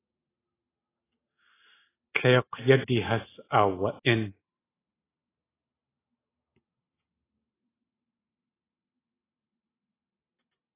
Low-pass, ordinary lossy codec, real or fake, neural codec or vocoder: 3.6 kHz; AAC, 16 kbps; real; none